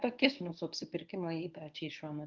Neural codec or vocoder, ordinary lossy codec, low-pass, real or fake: codec, 24 kHz, 0.9 kbps, WavTokenizer, medium speech release version 1; Opus, 24 kbps; 7.2 kHz; fake